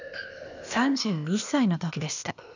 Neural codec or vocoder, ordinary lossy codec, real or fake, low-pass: codec, 16 kHz, 0.8 kbps, ZipCodec; none; fake; 7.2 kHz